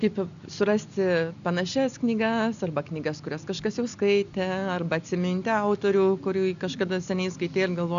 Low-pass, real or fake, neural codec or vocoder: 7.2 kHz; real; none